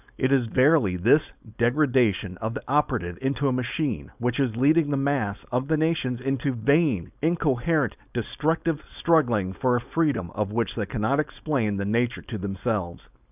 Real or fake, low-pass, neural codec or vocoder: fake; 3.6 kHz; codec, 16 kHz, 4.8 kbps, FACodec